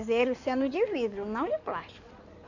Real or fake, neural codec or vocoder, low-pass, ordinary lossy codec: fake; codec, 16 kHz in and 24 kHz out, 2.2 kbps, FireRedTTS-2 codec; 7.2 kHz; none